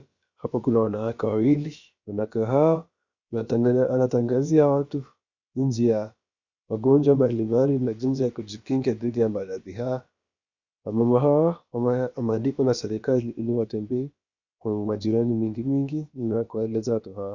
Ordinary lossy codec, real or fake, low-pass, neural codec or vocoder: Opus, 64 kbps; fake; 7.2 kHz; codec, 16 kHz, about 1 kbps, DyCAST, with the encoder's durations